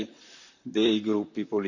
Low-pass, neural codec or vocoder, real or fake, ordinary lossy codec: 7.2 kHz; vocoder, 44.1 kHz, 128 mel bands, Pupu-Vocoder; fake; none